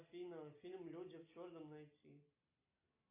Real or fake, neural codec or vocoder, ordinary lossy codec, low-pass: real; none; AAC, 32 kbps; 3.6 kHz